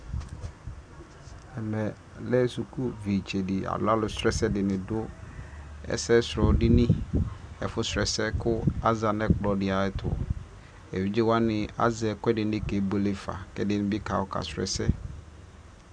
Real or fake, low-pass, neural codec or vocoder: real; 9.9 kHz; none